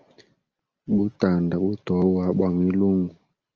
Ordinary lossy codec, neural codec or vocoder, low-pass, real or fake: Opus, 24 kbps; none; 7.2 kHz; real